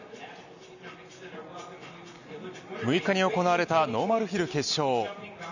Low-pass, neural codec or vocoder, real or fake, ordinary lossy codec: 7.2 kHz; none; real; none